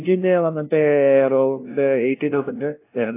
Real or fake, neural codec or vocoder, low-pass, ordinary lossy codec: fake; codec, 16 kHz, 0.5 kbps, FunCodec, trained on LibriTTS, 25 frames a second; 3.6 kHz; AAC, 24 kbps